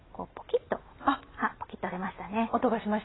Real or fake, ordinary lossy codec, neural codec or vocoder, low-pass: real; AAC, 16 kbps; none; 7.2 kHz